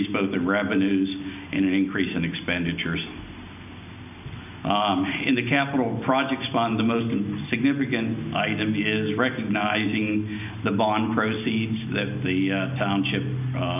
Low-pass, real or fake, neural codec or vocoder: 3.6 kHz; real; none